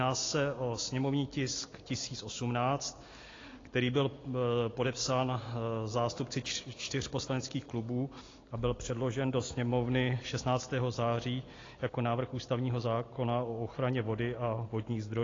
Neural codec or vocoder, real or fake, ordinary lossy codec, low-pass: none; real; AAC, 32 kbps; 7.2 kHz